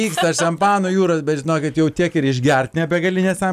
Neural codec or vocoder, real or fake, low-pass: none; real; 14.4 kHz